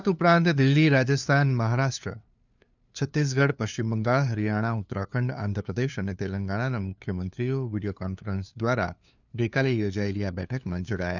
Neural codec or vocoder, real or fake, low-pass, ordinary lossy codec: codec, 16 kHz, 2 kbps, FunCodec, trained on LibriTTS, 25 frames a second; fake; 7.2 kHz; none